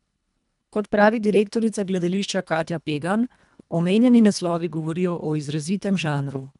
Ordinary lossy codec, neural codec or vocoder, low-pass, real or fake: none; codec, 24 kHz, 1.5 kbps, HILCodec; 10.8 kHz; fake